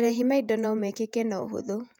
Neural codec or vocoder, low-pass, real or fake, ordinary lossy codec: vocoder, 48 kHz, 128 mel bands, Vocos; 19.8 kHz; fake; none